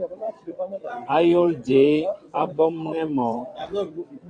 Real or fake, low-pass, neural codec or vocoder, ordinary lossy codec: fake; 9.9 kHz; vocoder, 22.05 kHz, 80 mel bands, WaveNeXt; AAC, 48 kbps